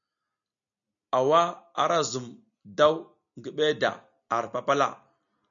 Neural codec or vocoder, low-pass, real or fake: none; 7.2 kHz; real